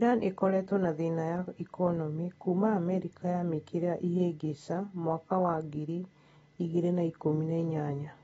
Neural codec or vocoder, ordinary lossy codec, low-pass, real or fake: none; AAC, 24 kbps; 19.8 kHz; real